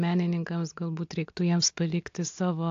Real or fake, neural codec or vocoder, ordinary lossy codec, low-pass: real; none; AAC, 48 kbps; 7.2 kHz